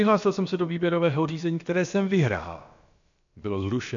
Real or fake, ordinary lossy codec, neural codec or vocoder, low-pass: fake; MP3, 64 kbps; codec, 16 kHz, about 1 kbps, DyCAST, with the encoder's durations; 7.2 kHz